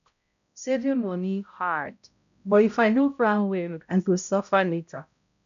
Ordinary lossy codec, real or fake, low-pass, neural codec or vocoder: none; fake; 7.2 kHz; codec, 16 kHz, 0.5 kbps, X-Codec, HuBERT features, trained on balanced general audio